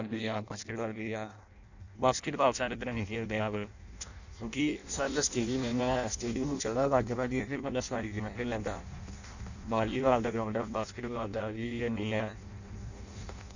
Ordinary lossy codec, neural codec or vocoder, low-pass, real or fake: none; codec, 16 kHz in and 24 kHz out, 0.6 kbps, FireRedTTS-2 codec; 7.2 kHz; fake